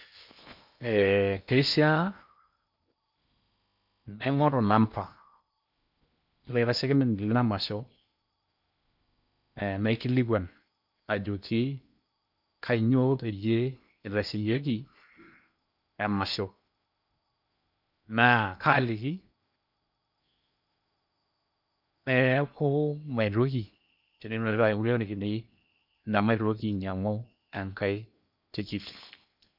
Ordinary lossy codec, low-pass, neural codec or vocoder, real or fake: none; 5.4 kHz; codec, 16 kHz in and 24 kHz out, 0.8 kbps, FocalCodec, streaming, 65536 codes; fake